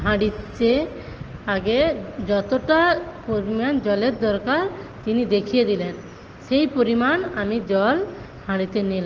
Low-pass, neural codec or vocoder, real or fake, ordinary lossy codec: 7.2 kHz; none; real; Opus, 16 kbps